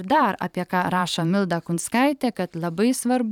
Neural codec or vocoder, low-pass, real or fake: vocoder, 44.1 kHz, 128 mel bands every 512 samples, BigVGAN v2; 19.8 kHz; fake